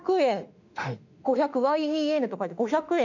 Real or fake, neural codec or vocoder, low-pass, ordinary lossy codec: fake; autoencoder, 48 kHz, 32 numbers a frame, DAC-VAE, trained on Japanese speech; 7.2 kHz; none